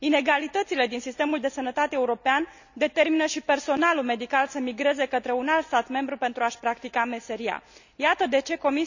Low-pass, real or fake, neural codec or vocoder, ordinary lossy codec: 7.2 kHz; real; none; none